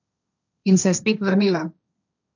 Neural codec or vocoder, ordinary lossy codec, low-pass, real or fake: codec, 16 kHz, 1.1 kbps, Voila-Tokenizer; none; 7.2 kHz; fake